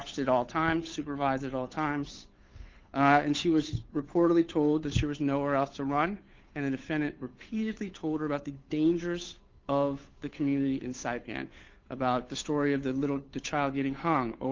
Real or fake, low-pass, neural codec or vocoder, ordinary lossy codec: fake; 7.2 kHz; codec, 16 kHz, 8 kbps, FunCodec, trained on Chinese and English, 25 frames a second; Opus, 24 kbps